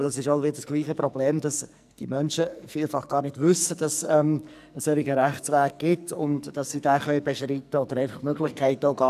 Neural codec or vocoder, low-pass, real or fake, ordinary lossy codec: codec, 44.1 kHz, 2.6 kbps, SNAC; 14.4 kHz; fake; none